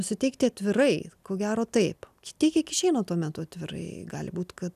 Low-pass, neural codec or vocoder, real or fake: 14.4 kHz; none; real